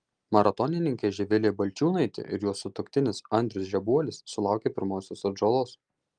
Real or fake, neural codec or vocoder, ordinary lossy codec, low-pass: real; none; Opus, 32 kbps; 9.9 kHz